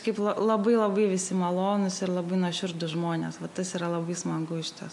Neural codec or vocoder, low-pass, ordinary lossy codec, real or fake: none; 10.8 kHz; MP3, 64 kbps; real